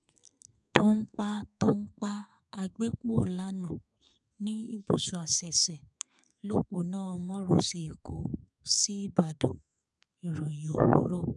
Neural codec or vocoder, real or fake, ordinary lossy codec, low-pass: codec, 32 kHz, 1.9 kbps, SNAC; fake; none; 10.8 kHz